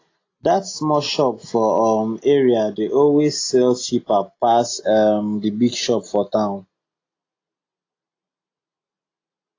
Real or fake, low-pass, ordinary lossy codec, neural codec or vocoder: real; 7.2 kHz; AAC, 32 kbps; none